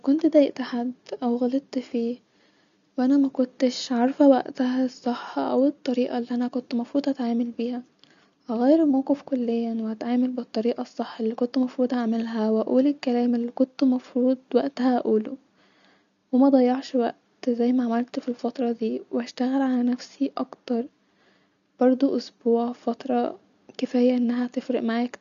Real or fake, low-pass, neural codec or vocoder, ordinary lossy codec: real; 7.2 kHz; none; none